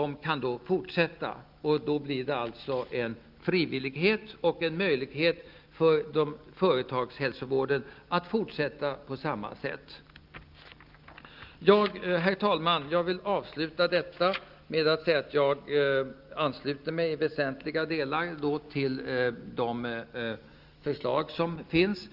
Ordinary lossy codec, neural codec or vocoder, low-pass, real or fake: Opus, 24 kbps; none; 5.4 kHz; real